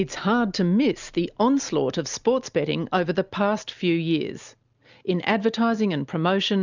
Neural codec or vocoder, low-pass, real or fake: none; 7.2 kHz; real